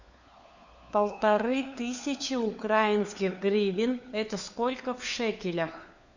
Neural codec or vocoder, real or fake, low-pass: codec, 16 kHz, 4 kbps, FunCodec, trained on LibriTTS, 50 frames a second; fake; 7.2 kHz